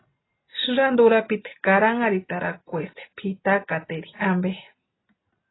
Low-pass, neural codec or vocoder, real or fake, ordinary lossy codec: 7.2 kHz; none; real; AAC, 16 kbps